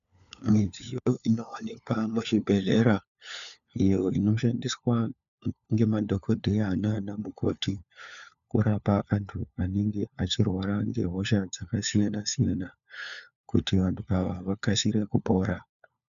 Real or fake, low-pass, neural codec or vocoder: fake; 7.2 kHz; codec, 16 kHz, 4 kbps, FunCodec, trained on LibriTTS, 50 frames a second